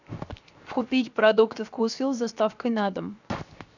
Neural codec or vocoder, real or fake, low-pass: codec, 16 kHz, 0.7 kbps, FocalCodec; fake; 7.2 kHz